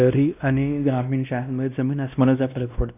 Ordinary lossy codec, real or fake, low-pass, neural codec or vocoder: none; fake; 3.6 kHz; codec, 16 kHz, 1 kbps, X-Codec, WavLM features, trained on Multilingual LibriSpeech